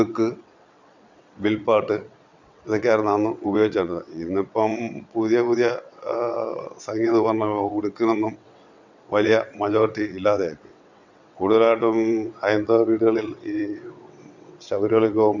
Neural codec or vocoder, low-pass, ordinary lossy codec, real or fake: vocoder, 22.05 kHz, 80 mel bands, WaveNeXt; 7.2 kHz; none; fake